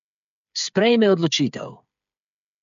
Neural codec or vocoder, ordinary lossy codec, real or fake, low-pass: codec, 16 kHz, 8 kbps, FreqCodec, smaller model; MP3, 48 kbps; fake; 7.2 kHz